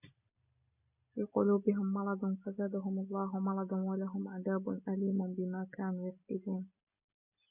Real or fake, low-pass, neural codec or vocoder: real; 3.6 kHz; none